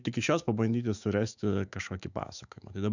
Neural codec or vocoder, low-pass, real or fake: autoencoder, 48 kHz, 128 numbers a frame, DAC-VAE, trained on Japanese speech; 7.2 kHz; fake